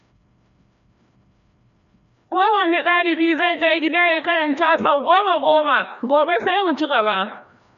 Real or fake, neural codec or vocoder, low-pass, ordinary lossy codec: fake; codec, 16 kHz, 1 kbps, FreqCodec, larger model; 7.2 kHz; none